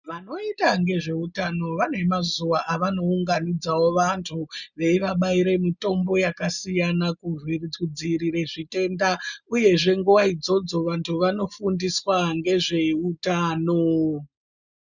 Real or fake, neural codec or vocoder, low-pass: real; none; 7.2 kHz